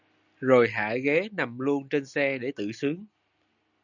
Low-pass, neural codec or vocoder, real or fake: 7.2 kHz; none; real